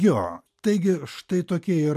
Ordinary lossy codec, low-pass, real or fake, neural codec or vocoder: MP3, 96 kbps; 14.4 kHz; real; none